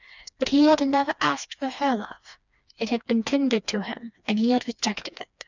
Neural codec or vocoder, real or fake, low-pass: codec, 16 kHz, 2 kbps, FreqCodec, smaller model; fake; 7.2 kHz